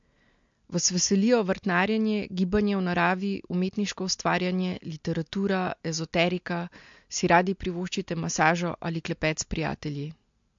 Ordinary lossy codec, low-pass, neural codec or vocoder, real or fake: MP3, 48 kbps; 7.2 kHz; none; real